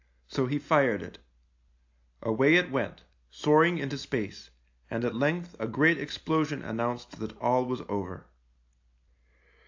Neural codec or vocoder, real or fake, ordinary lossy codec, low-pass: none; real; AAC, 48 kbps; 7.2 kHz